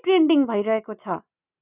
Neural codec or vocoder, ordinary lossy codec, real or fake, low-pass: none; none; real; 3.6 kHz